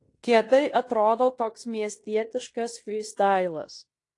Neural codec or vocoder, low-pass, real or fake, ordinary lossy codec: codec, 16 kHz in and 24 kHz out, 0.9 kbps, LongCat-Audio-Codec, fine tuned four codebook decoder; 10.8 kHz; fake; AAC, 48 kbps